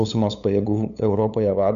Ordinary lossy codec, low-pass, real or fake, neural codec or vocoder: MP3, 96 kbps; 7.2 kHz; fake; codec, 16 kHz, 8 kbps, FunCodec, trained on LibriTTS, 25 frames a second